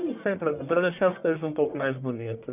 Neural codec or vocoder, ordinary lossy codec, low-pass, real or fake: codec, 44.1 kHz, 1.7 kbps, Pupu-Codec; none; 3.6 kHz; fake